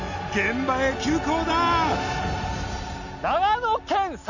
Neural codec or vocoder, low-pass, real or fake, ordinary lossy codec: none; 7.2 kHz; real; none